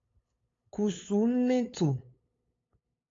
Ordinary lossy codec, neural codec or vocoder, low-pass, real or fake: AAC, 32 kbps; codec, 16 kHz, 8 kbps, FunCodec, trained on LibriTTS, 25 frames a second; 7.2 kHz; fake